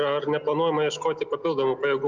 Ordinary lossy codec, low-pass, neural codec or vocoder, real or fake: Opus, 24 kbps; 7.2 kHz; none; real